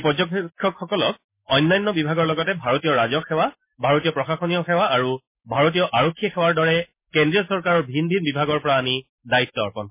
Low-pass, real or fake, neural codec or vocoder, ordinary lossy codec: 3.6 kHz; real; none; MP3, 24 kbps